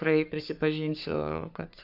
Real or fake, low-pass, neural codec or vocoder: fake; 5.4 kHz; codec, 44.1 kHz, 3.4 kbps, Pupu-Codec